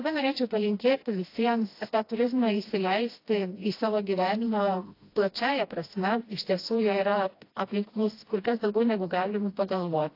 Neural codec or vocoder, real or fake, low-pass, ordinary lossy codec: codec, 16 kHz, 1 kbps, FreqCodec, smaller model; fake; 5.4 kHz; AAC, 32 kbps